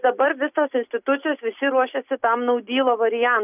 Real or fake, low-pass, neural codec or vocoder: real; 3.6 kHz; none